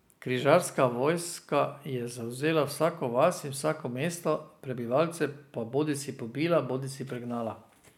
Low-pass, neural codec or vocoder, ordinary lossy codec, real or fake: 19.8 kHz; none; none; real